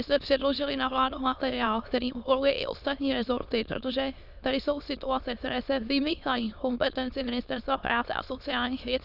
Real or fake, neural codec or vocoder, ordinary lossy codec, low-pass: fake; autoencoder, 22.05 kHz, a latent of 192 numbers a frame, VITS, trained on many speakers; Opus, 64 kbps; 5.4 kHz